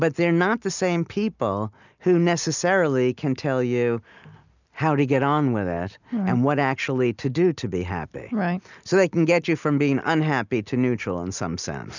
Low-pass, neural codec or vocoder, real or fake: 7.2 kHz; none; real